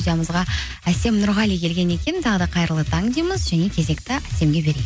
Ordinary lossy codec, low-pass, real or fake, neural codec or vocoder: none; none; real; none